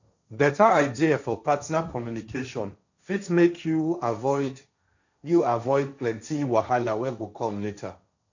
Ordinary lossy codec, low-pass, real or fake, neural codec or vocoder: none; 7.2 kHz; fake; codec, 16 kHz, 1.1 kbps, Voila-Tokenizer